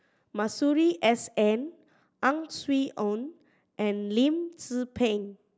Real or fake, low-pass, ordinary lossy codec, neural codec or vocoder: real; none; none; none